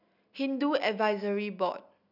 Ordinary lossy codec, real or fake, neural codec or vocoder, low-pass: none; real; none; 5.4 kHz